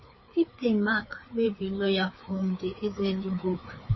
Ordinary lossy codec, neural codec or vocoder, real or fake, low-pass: MP3, 24 kbps; codec, 16 kHz, 4 kbps, FreqCodec, larger model; fake; 7.2 kHz